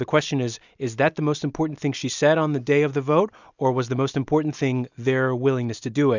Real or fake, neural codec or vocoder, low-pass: real; none; 7.2 kHz